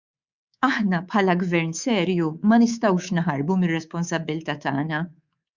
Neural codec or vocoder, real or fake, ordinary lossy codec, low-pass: codec, 24 kHz, 3.1 kbps, DualCodec; fake; Opus, 64 kbps; 7.2 kHz